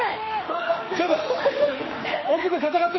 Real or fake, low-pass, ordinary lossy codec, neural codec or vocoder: fake; 7.2 kHz; MP3, 24 kbps; autoencoder, 48 kHz, 32 numbers a frame, DAC-VAE, trained on Japanese speech